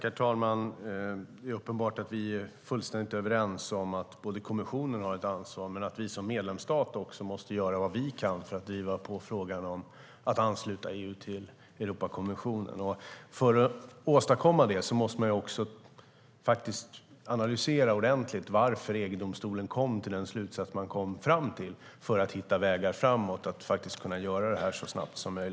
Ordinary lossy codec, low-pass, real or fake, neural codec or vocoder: none; none; real; none